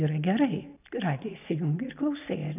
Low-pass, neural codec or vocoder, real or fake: 3.6 kHz; none; real